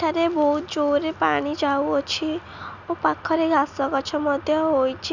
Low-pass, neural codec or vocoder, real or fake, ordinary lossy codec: 7.2 kHz; none; real; none